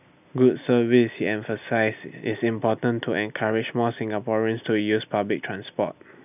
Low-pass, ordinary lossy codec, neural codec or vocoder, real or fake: 3.6 kHz; none; none; real